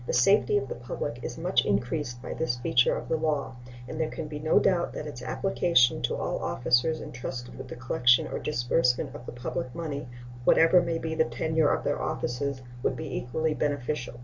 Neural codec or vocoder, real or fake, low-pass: none; real; 7.2 kHz